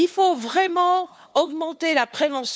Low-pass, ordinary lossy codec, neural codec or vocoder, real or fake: none; none; codec, 16 kHz, 2 kbps, FunCodec, trained on LibriTTS, 25 frames a second; fake